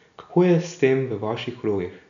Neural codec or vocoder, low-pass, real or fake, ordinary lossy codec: none; 7.2 kHz; real; none